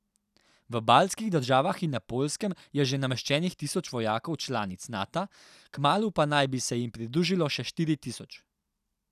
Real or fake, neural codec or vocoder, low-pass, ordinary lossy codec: real; none; 14.4 kHz; none